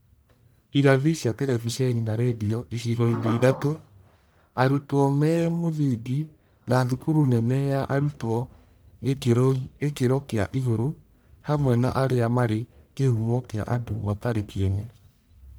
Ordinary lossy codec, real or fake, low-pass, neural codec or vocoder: none; fake; none; codec, 44.1 kHz, 1.7 kbps, Pupu-Codec